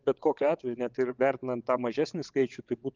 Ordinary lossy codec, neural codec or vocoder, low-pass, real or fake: Opus, 24 kbps; vocoder, 22.05 kHz, 80 mel bands, WaveNeXt; 7.2 kHz; fake